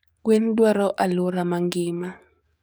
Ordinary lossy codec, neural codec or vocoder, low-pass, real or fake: none; codec, 44.1 kHz, 7.8 kbps, DAC; none; fake